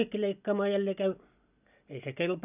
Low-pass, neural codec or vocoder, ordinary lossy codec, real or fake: 3.6 kHz; none; none; real